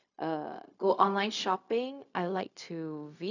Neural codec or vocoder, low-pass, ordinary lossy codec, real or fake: codec, 16 kHz, 0.4 kbps, LongCat-Audio-Codec; 7.2 kHz; none; fake